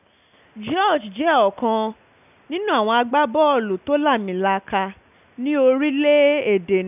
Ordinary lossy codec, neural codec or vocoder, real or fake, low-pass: none; none; real; 3.6 kHz